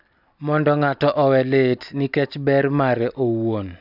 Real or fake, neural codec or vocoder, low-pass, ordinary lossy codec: real; none; 5.4 kHz; none